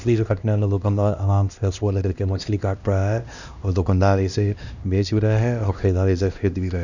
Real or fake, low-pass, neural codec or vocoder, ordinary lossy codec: fake; 7.2 kHz; codec, 16 kHz, 1 kbps, X-Codec, HuBERT features, trained on LibriSpeech; none